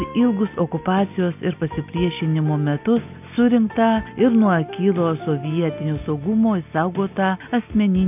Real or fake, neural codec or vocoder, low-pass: real; none; 3.6 kHz